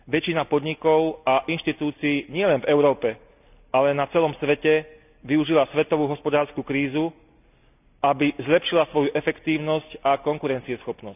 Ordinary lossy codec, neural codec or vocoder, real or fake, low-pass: none; none; real; 3.6 kHz